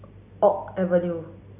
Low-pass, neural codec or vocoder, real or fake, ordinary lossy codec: 3.6 kHz; none; real; none